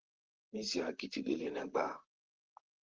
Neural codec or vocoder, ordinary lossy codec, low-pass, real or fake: vocoder, 44.1 kHz, 128 mel bands, Pupu-Vocoder; Opus, 16 kbps; 7.2 kHz; fake